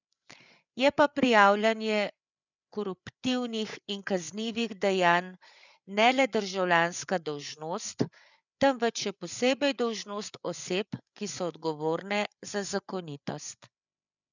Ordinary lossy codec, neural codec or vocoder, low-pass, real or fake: none; codec, 16 kHz, 8 kbps, FreqCodec, larger model; 7.2 kHz; fake